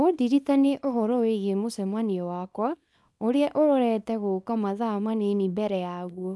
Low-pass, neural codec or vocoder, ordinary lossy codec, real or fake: none; codec, 24 kHz, 0.9 kbps, WavTokenizer, small release; none; fake